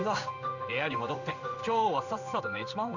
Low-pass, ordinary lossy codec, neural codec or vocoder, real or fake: 7.2 kHz; none; codec, 16 kHz in and 24 kHz out, 1 kbps, XY-Tokenizer; fake